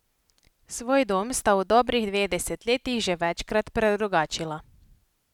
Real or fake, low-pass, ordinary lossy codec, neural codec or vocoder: real; 19.8 kHz; none; none